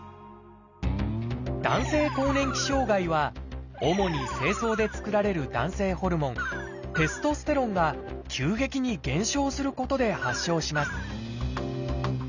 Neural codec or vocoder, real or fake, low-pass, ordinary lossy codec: none; real; 7.2 kHz; none